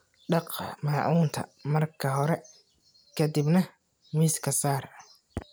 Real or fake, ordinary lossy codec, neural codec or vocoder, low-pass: real; none; none; none